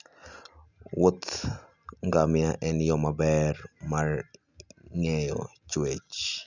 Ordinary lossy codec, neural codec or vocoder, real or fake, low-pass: none; none; real; 7.2 kHz